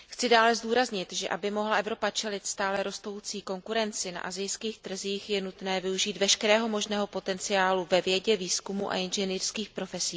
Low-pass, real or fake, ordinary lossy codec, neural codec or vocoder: none; real; none; none